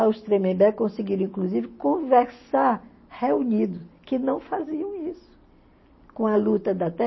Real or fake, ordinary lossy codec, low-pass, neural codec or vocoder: real; MP3, 24 kbps; 7.2 kHz; none